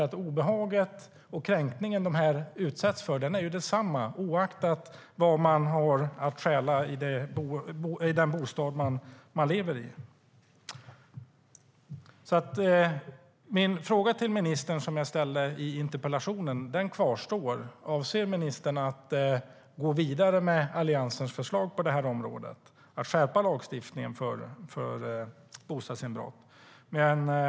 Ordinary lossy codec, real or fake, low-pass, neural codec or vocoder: none; real; none; none